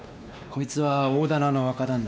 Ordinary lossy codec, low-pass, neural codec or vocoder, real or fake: none; none; codec, 16 kHz, 2 kbps, X-Codec, WavLM features, trained on Multilingual LibriSpeech; fake